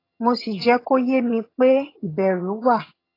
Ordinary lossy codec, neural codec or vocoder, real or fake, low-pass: AAC, 24 kbps; vocoder, 22.05 kHz, 80 mel bands, HiFi-GAN; fake; 5.4 kHz